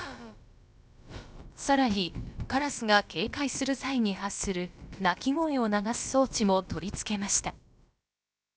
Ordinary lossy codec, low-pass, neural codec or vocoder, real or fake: none; none; codec, 16 kHz, about 1 kbps, DyCAST, with the encoder's durations; fake